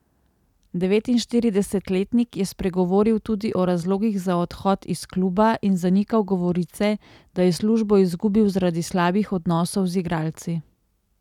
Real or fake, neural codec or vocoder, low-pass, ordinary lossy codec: real; none; 19.8 kHz; none